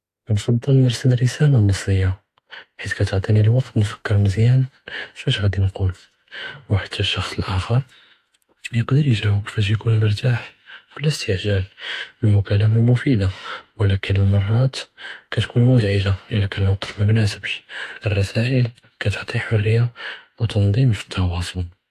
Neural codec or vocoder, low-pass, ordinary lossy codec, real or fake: autoencoder, 48 kHz, 32 numbers a frame, DAC-VAE, trained on Japanese speech; 14.4 kHz; AAC, 64 kbps; fake